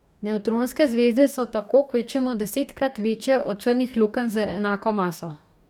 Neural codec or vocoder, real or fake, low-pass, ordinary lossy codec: codec, 44.1 kHz, 2.6 kbps, DAC; fake; 19.8 kHz; none